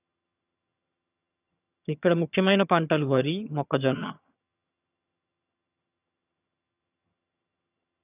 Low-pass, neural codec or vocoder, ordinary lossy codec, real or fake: 3.6 kHz; vocoder, 22.05 kHz, 80 mel bands, HiFi-GAN; none; fake